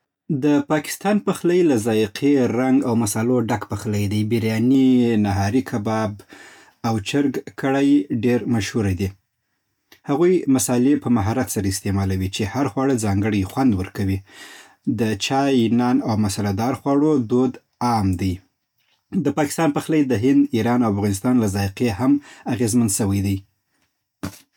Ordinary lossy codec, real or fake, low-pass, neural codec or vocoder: none; real; 19.8 kHz; none